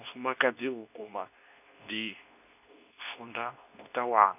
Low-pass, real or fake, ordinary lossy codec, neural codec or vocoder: 3.6 kHz; fake; none; codec, 24 kHz, 0.9 kbps, WavTokenizer, medium speech release version 2